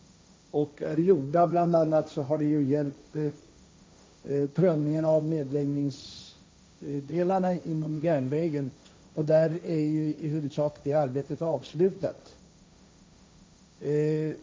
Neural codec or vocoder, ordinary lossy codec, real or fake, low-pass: codec, 16 kHz, 1.1 kbps, Voila-Tokenizer; none; fake; none